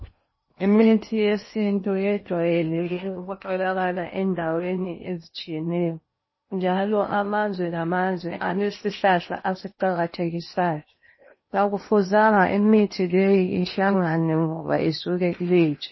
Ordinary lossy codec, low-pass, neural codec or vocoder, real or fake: MP3, 24 kbps; 7.2 kHz; codec, 16 kHz in and 24 kHz out, 0.8 kbps, FocalCodec, streaming, 65536 codes; fake